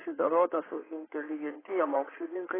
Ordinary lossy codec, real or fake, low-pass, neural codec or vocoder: AAC, 16 kbps; fake; 3.6 kHz; codec, 16 kHz in and 24 kHz out, 2.2 kbps, FireRedTTS-2 codec